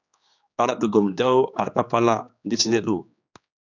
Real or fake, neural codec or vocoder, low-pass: fake; codec, 16 kHz, 2 kbps, X-Codec, HuBERT features, trained on general audio; 7.2 kHz